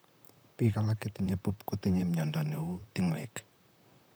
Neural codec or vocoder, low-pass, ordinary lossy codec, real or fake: vocoder, 44.1 kHz, 128 mel bands, Pupu-Vocoder; none; none; fake